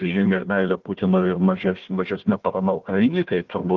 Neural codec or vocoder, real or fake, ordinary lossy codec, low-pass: codec, 16 kHz, 1 kbps, FunCodec, trained on Chinese and English, 50 frames a second; fake; Opus, 16 kbps; 7.2 kHz